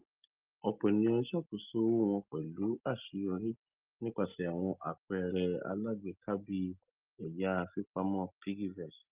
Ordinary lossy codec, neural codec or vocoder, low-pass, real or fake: Opus, 24 kbps; none; 3.6 kHz; real